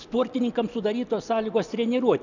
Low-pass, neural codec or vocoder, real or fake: 7.2 kHz; none; real